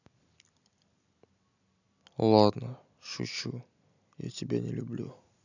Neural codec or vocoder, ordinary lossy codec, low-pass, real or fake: none; none; 7.2 kHz; real